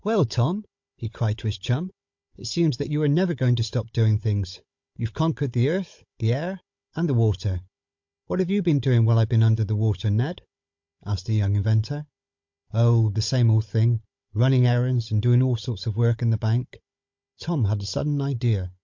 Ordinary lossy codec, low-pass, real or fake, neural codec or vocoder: MP3, 48 kbps; 7.2 kHz; fake; codec, 16 kHz, 16 kbps, FunCodec, trained on Chinese and English, 50 frames a second